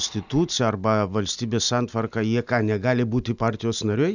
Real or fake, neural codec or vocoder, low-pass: real; none; 7.2 kHz